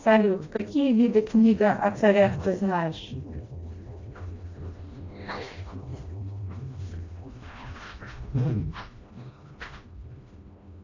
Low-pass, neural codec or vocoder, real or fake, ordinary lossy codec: 7.2 kHz; codec, 16 kHz, 1 kbps, FreqCodec, smaller model; fake; AAC, 48 kbps